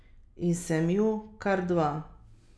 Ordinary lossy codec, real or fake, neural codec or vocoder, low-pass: none; real; none; none